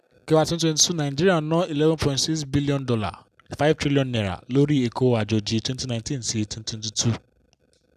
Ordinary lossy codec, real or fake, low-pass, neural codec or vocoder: none; real; 14.4 kHz; none